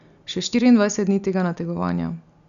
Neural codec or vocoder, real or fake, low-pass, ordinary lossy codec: none; real; 7.2 kHz; none